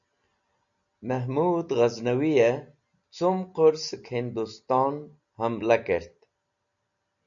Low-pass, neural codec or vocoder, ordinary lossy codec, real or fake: 7.2 kHz; none; MP3, 96 kbps; real